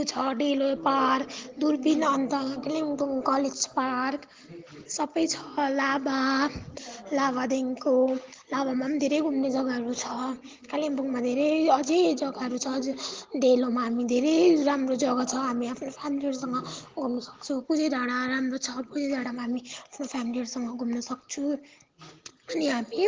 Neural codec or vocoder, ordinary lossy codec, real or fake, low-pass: none; Opus, 16 kbps; real; 7.2 kHz